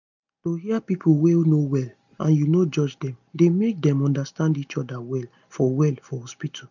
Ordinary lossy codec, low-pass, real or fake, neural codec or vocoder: none; 7.2 kHz; real; none